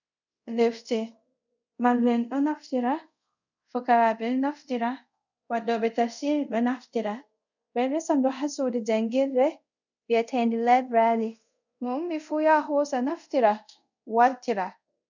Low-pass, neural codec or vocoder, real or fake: 7.2 kHz; codec, 24 kHz, 0.5 kbps, DualCodec; fake